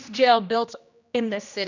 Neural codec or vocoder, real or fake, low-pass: codec, 16 kHz, 1 kbps, X-Codec, HuBERT features, trained on general audio; fake; 7.2 kHz